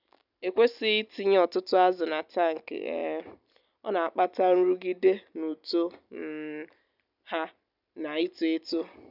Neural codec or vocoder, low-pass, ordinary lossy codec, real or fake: none; 5.4 kHz; none; real